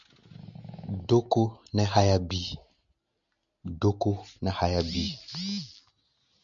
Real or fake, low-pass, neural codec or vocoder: real; 7.2 kHz; none